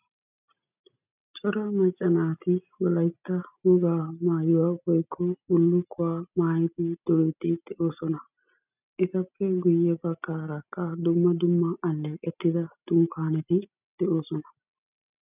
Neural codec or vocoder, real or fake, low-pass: none; real; 3.6 kHz